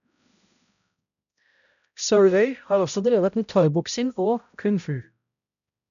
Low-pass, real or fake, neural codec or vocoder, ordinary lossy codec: 7.2 kHz; fake; codec, 16 kHz, 0.5 kbps, X-Codec, HuBERT features, trained on balanced general audio; none